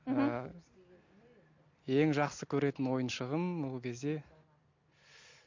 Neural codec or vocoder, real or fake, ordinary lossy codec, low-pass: none; real; MP3, 48 kbps; 7.2 kHz